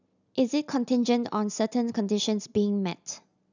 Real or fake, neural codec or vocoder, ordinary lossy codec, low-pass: real; none; none; 7.2 kHz